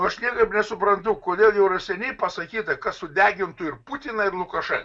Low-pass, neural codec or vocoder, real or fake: 9.9 kHz; none; real